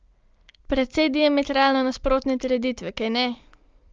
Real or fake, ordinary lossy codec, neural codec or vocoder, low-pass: real; Opus, 24 kbps; none; 7.2 kHz